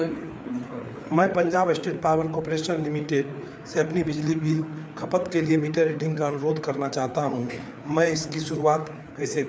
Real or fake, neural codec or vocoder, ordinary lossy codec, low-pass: fake; codec, 16 kHz, 4 kbps, FreqCodec, larger model; none; none